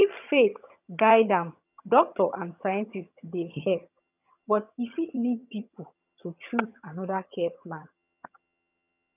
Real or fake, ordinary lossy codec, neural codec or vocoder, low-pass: fake; none; vocoder, 22.05 kHz, 80 mel bands, HiFi-GAN; 3.6 kHz